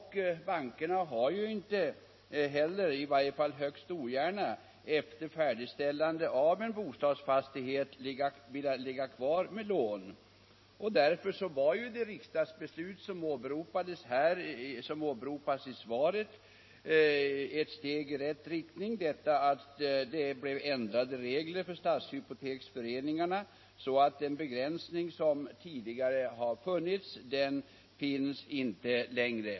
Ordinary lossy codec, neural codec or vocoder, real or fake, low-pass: MP3, 24 kbps; none; real; 7.2 kHz